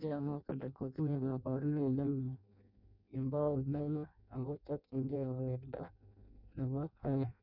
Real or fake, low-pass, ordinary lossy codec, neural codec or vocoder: fake; 5.4 kHz; none; codec, 16 kHz in and 24 kHz out, 0.6 kbps, FireRedTTS-2 codec